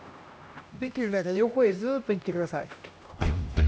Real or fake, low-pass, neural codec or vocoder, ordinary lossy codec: fake; none; codec, 16 kHz, 1 kbps, X-Codec, HuBERT features, trained on LibriSpeech; none